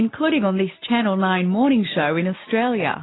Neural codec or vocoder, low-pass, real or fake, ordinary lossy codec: none; 7.2 kHz; real; AAC, 16 kbps